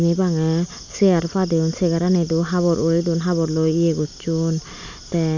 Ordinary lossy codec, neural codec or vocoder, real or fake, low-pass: none; none; real; 7.2 kHz